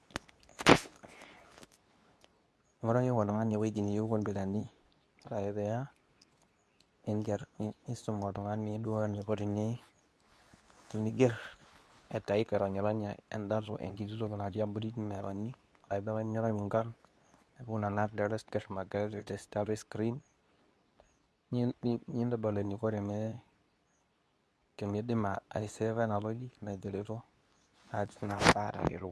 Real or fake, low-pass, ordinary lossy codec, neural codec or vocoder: fake; none; none; codec, 24 kHz, 0.9 kbps, WavTokenizer, medium speech release version 2